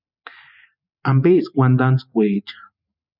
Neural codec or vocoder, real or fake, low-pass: vocoder, 44.1 kHz, 128 mel bands every 512 samples, BigVGAN v2; fake; 5.4 kHz